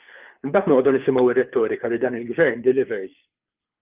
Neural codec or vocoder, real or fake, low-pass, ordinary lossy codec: codec, 24 kHz, 6 kbps, HILCodec; fake; 3.6 kHz; Opus, 32 kbps